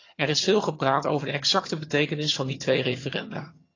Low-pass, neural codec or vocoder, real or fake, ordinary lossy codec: 7.2 kHz; vocoder, 22.05 kHz, 80 mel bands, HiFi-GAN; fake; AAC, 32 kbps